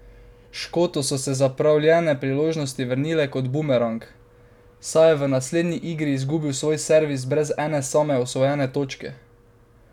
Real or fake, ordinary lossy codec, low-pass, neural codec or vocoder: real; none; 19.8 kHz; none